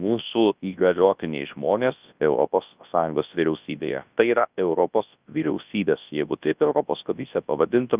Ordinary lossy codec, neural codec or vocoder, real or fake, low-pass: Opus, 24 kbps; codec, 24 kHz, 0.9 kbps, WavTokenizer, large speech release; fake; 3.6 kHz